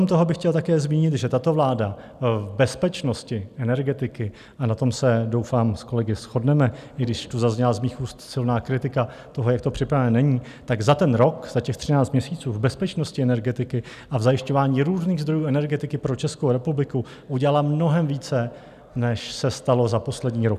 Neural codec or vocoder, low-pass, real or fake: none; 14.4 kHz; real